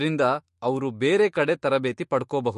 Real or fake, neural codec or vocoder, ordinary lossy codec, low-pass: fake; autoencoder, 48 kHz, 128 numbers a frame, DAC-VAE, trained on Japanese speech; MP3, 48 kbps; 14.4 kHz